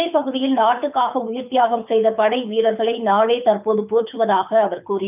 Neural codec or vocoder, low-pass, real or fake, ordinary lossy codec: codec, 24 kHz, 6 kbps, HILCodec; 3.6 kHz; fake; none